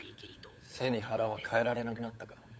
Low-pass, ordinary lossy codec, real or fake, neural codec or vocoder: none; none; fake; codec, 16 kHz, 8 kbps, FunCodec, trained on LibriTTS, 25 frames a second